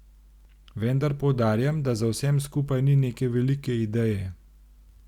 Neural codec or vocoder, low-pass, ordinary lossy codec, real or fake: none; 19.8 kHz; Opus, 64 kbps; real